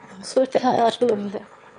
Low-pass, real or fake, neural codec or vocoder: 9.9 kHz; fake; autoencoder, 22.05 kHz, a latent of 192 numbers a frame, VITS, trained on one speaker